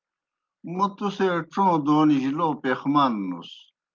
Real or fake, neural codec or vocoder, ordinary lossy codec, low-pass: real; none; Opus, 24 kbps; 7.2 kHz